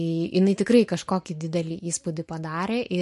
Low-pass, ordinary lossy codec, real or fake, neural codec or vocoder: 14.4 kHz; MP3, 48 kbps; real; none